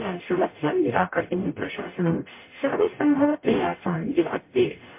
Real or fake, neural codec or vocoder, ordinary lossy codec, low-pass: fake; codec, 44.1 kHz, 0.9 kbps, DAC; MP3, 24 kbps; 3.6 kHz